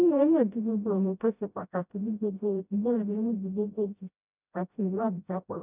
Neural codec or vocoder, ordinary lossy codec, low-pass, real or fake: codec, 16 kHz, 0.5 kbps, FreqCodec, smaller model; none; 3.6 kHz; fake